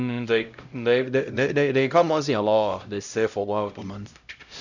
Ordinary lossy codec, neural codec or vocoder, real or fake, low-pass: none; codec, 16 kHz, 0.5 kbps, X-Codec, HuBERT features, trained on LibriSpeech; fake; 7.2 kHz